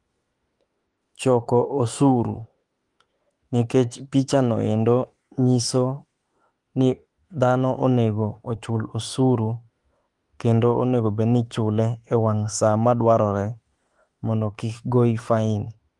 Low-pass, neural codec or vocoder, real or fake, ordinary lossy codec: 10.8 kHz; autoencoder, 48 kHz, 32 numbers a frame, DAC-VAE, trained on Japanese speech; fake; Opus, 24 kbps